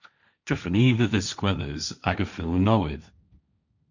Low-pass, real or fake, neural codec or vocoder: 7.2 kHz; fake; codec, 16 kHz, 1.1 kbps, Voila-Tokenizer